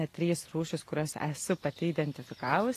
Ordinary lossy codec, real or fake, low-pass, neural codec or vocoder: AAC, 48 kbps; real; 14.4 kHz; none